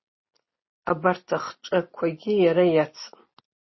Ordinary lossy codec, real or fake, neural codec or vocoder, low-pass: MP3, 24 kbps; real; none; 7.2 kHz